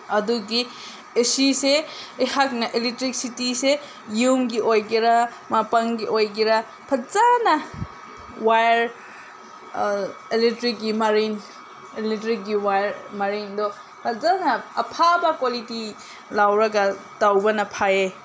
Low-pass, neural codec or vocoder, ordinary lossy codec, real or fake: none; none; none; real